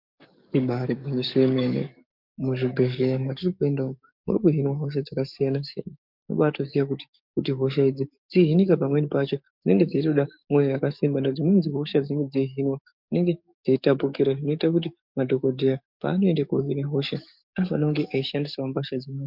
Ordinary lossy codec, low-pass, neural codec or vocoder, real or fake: MP3, 48 kbps; 5.4 kHz; codec, 44.1 kHz, 7.8 kbps, DAC; fake